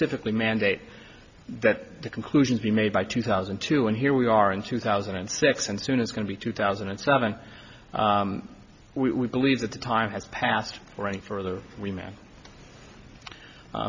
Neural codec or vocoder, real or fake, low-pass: none; real; 7.2 kHz